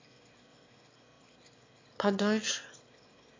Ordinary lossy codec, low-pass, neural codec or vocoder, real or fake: MP3, 64 kbps; 7.2 kHz; autoencoder, 22.05 kHz, a latent of 192 numbers a frame, VITS, trained on one speaker; fake